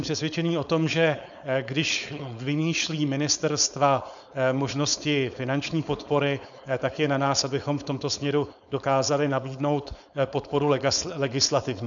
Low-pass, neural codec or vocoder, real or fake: 7.2 kHz; codec, 16 kHz, 4.8 kbps, FACodec; fake